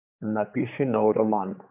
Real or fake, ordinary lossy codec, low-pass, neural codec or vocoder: fake; AAC, 32 kbps; 3.6 kHz; codec, 16 kHz, 4 kbps, X-Codec, HuBERT features, trained on general audio